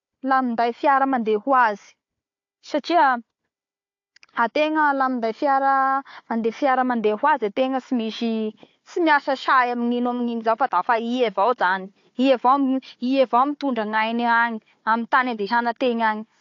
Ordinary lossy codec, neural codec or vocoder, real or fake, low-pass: AAC, 48 kbps; codec, 16 kHz, 4 kbps, FunCodec, trained on Chinese and English, 50 frames a second; fake; 7.2 kHz